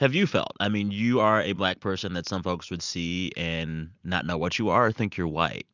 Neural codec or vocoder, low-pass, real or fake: none; 7.2 kHz; real